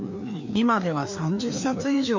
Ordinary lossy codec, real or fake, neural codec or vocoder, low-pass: MP3, 48 kbps; fake; codec, 16 kHz, 2 kbps, FreqCodec, larger model; 7.2 kHz